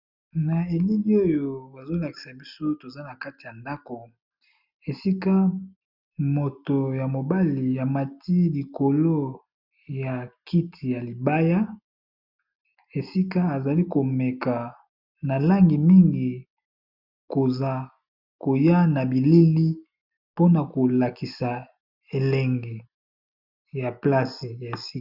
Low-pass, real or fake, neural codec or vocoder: 5.4 kHz; real; none